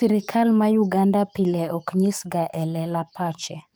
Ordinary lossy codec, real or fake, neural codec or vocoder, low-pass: none; fake; codec, 44.1 kHz, 7.8 kbps, DAC; none